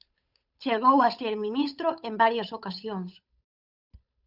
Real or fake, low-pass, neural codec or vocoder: fake; 5.4 kHz; codec, 16 kHz, 8 kbps, FunCodec, trained on Chinese and English, 25 frames a second